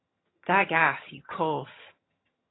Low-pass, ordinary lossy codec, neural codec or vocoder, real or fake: 7.2 kHz; AAC, 16 kbps; vocoder, 22.05 kHz, 80 mel bands, HiFi-GAN; fake